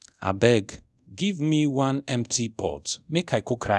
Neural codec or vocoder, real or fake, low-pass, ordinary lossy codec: codec, 24 kHz, 0.5 kbps, DualCodec; fake; none; none